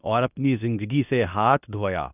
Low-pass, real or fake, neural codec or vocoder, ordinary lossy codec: 3.6 kHz; fake; codec, 24 kHz, 0.9 kbps, WavTokenizer, medium speech release version 2; none